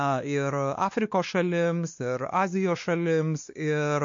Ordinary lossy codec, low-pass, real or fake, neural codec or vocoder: MP3, 48 kbps; 7.2 kHz; fake; codec, 16 kHz, 2 kbps, X-Codec, WavLM features, trained on Multilingual LibriSpeech